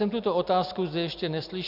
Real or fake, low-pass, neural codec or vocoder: real; 5.4 kHz; none